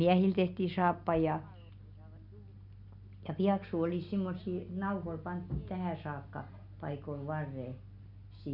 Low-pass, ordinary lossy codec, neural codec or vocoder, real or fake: 5.4 kHz; none; none; real